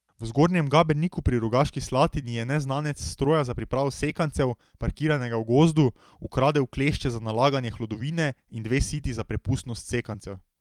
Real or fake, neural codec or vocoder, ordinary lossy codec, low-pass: fake; vocoder, 44.1 kHz, 128 mel bands every 256 samples, BigVGAN v2; Opus, 32 kbps; 19.8 kHz